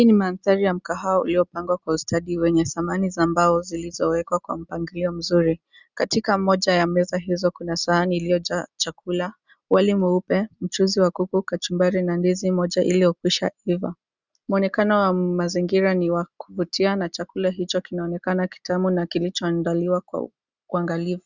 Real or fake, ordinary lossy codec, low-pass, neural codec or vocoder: real; Opus, 64 kbps; 7.2 kHz; none